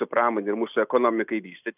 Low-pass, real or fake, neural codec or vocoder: 3.6 kHz; real; none